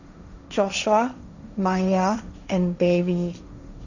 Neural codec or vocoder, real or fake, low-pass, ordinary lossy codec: codec, 16 kHz, 1.1 kbps, Voila-Tokenizer; fake; 7.2 kHz; none